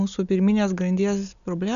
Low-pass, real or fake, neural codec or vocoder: 7.2 kHz; real; none